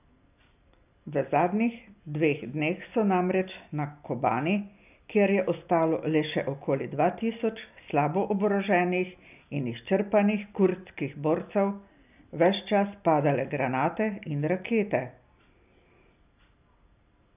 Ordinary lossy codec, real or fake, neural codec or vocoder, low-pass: none; real; none; 3.6 kHz